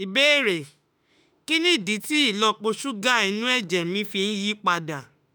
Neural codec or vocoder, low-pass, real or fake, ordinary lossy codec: autoencoder, 48 kHz, 32 numbers a frame, DAC-VAE, trained on Japanese speech; none; fake; none